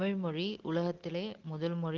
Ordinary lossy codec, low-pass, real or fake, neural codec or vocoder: Opus, 16 kbps; 7.2 kHz; real; none